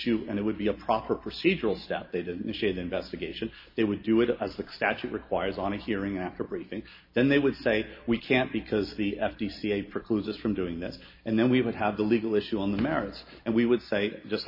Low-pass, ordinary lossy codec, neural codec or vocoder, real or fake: 5.4 kHz; MP3, 24 kbps; none; real